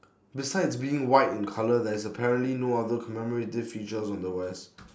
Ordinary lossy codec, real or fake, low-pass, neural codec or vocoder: none; real; none; none